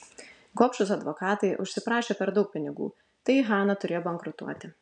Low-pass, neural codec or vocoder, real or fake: 9.9 kHz; none; real